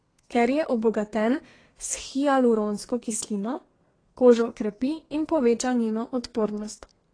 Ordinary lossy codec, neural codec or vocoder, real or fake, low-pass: AAC, 32 kbps; codec, 32 kHz, 1.9 kbps, SNAC; fake; 9.9 kHz